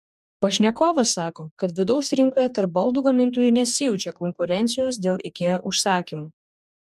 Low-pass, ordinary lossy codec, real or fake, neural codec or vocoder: 14.4 kHz; MP3, 96 kbps; fake; codec, 44.1 kHz, 2.6 kbps, DAC